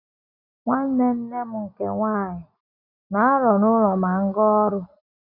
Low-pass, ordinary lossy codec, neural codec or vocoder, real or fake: 5.4 kHz; none; none; real